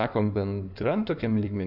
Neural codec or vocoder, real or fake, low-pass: codec, 16 kHz in and 24 kHz out, 2.2 kbps, FireRedTTS-2 codec; fake; 5.4 kHz